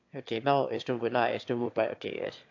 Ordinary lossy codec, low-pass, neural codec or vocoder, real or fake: AAC, 48 kbps; 7.2 kHz; autoencoder, 22.05 kHz, a latent of 192 numbers a frame, VITS, trained on one speaker; fake